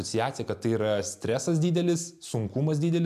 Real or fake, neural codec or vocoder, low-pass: real; none; 14.4 kHz